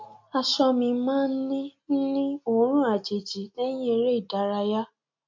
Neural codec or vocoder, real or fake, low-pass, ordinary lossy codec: none; real; 7.2 kHz; MP3, 48 kbps